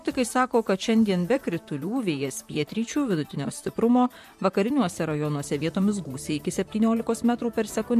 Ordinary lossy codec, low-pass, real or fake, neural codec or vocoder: MP3, 64 kbps; 14.4 kHz; fake; vocoder, 44.1 kHz, 128 mel bands, Pupu-Vocoder